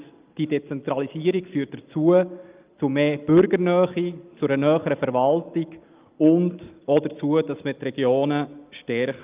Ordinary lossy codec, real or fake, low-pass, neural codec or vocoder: Opus, 24 kbps; real; 3.6 kHz; none